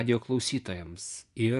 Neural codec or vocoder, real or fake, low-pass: vocoder, 24 kHz, 100 mel bands, Vocos; fake; 10.8 kHz